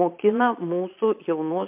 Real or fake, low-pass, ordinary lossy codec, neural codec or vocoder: fake; 3.6 kHz; MP3, 32 kbps; vocoder, 22.05 kHz, 80 mel bands, Vocos